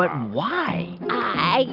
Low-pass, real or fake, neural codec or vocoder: 5.4 kHz; real; none